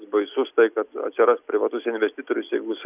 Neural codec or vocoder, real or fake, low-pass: none; real; 3.6 kHz